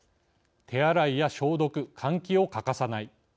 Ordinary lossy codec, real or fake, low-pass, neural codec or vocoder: none; real; none; none